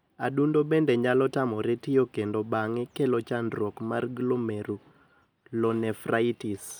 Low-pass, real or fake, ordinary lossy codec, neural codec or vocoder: none; real; none; none